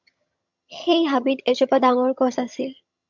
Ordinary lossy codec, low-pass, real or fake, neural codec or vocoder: MP3, 64 kbps; 7.2 kHz; fake; vocoder, 22.05 kHz, 80 mel bands, HiFi-GAN